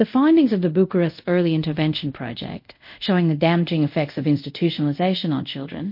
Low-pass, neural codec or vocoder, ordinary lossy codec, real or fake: 5.4 kHz; codec, 24 kHz, 0.5 kbps, DualCodec; MP3, 32 kbps; fake